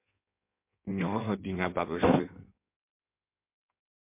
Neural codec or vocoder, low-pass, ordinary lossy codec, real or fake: codec, 16 kHz in and 24 kHz out, 1.1 kbps, FireRedTTS-2 codec; 3.6 kHz; MP3, 32 kbps; fake